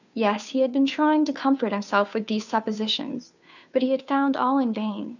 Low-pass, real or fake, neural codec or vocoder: 7.2 kHz; fake; codec, 16 kHz, 2 kbps, FunCodec, trained on Chinese and English, 25 frames a second